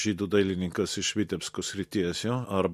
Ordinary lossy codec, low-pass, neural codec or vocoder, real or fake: MP3, 64 kbps; 14.4 kHz; none; real